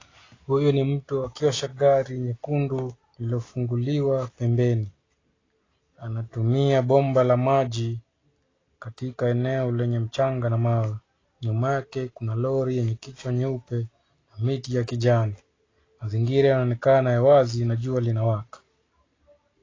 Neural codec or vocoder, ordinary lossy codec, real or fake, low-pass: none; AAC, 32 kbps; real; 7.2 kHz